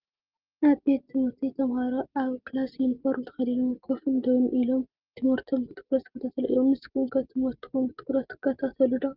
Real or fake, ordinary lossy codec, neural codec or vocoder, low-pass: real; Opus, 32 kbps; none; 5.4 kHz